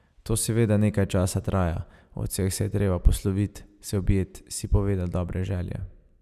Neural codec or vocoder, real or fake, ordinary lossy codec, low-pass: none; real; none; 14.4 kHz